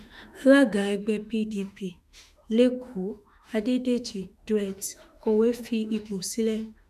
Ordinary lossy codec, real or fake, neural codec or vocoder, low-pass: none; fake; autoencoder, 48 kHz, 32 numbers a frame, DAC-VAE, trained on Japanese speech; 14.4 kHz